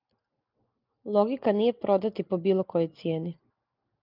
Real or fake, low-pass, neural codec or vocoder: real; 5.4 kHz; none